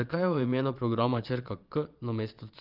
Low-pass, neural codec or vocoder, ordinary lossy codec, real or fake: 5.4 kHz; vocoder, 22.05 kHz, 80 mel bands, Vocos; Opus, 32 kbps; fake